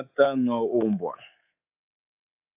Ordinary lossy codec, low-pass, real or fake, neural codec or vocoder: AAC, 32 kbps; 3.6 kHz; fake; codec, 24 kHz, 3.1 kbps, DualCodec